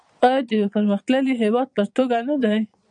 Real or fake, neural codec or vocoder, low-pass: fake; vocoder, 22.05 kHz, 80 mel bands, Vocos; 9.9 kHz